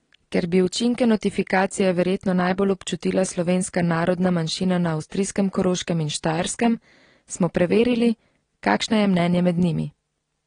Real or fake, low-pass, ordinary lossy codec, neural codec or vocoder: real; 9.9 kHz; AAC, 32 kbps; none